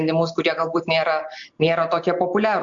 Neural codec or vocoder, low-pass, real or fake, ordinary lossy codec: none; 7.2 kHz; real; AAC, 64 kbps